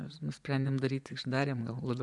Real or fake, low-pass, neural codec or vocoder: fake; 10.8 kHz; vocoder, 24 kHz, 100 mel bands, Vocos